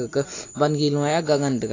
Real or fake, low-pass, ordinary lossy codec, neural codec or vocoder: real; 7.2 kHz; AAC, 32 kbps; none